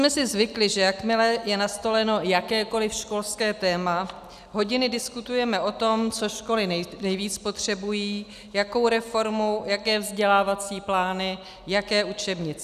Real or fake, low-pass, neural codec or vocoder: real; 14.4 kHz; none